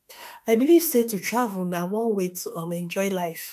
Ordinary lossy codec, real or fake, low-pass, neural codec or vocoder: none; fake; 14.4 kHz; autoencoder, 48 kHz, 32 numbers a frame, DAC-VAE, trained on Japanese speech